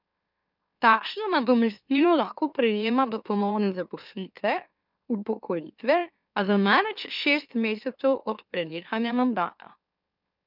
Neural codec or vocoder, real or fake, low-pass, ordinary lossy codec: autoencoder, 44.1 kHz, a latent of 192 numbers a frame, MeloTTS; fake; 5.4 kHz; none